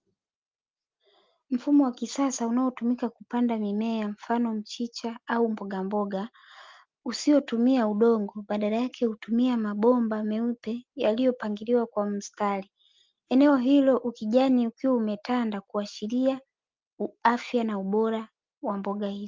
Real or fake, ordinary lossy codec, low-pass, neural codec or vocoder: real; Opus, 24 kbps; 7.2 kHz; none